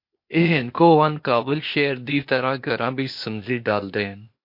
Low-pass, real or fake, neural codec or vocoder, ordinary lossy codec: 5.4 kHz; fake; codec, 16 kHz, 0.8 kbps, ZipCodec; MP3, 32 kbps